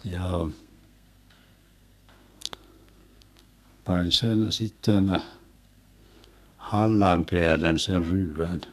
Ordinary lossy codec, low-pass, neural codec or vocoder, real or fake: none; 14.4 kHz; codec, 32 kHz, 1.9 kbps, SNAC; fake